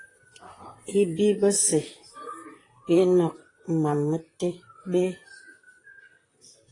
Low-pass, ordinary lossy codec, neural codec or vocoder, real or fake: 10.8 kHz; AAC, 32 kbps; vocoder, 44.1 kHz, 128 mel bands, Pupu-Vocoder; fake